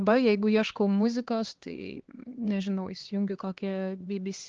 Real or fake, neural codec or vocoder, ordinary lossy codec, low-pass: fake; codec, 16 kHz, 2 kbps, X-Codec, HuBERT features, trained on LibriSpeech; Opus, 16 kbps; 7.2 kHz